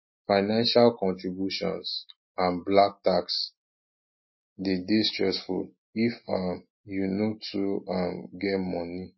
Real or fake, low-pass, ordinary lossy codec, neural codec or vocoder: real; 7.2 kHz; MP3, 24 kbps; none